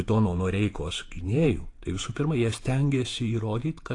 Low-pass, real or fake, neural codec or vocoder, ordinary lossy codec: 10.8 kHz; fake; vocoder, 48 kHz, 128 mel bands, Vocos; AAC, 48 kbps